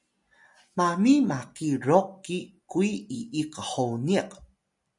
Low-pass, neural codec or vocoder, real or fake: 10.8 kHz; none; real